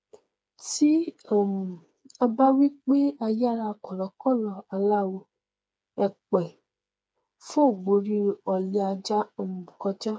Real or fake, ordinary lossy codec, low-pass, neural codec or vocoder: fake; none; none; codec, 16 kHz, 4 kbps, FreqCodec, smaller model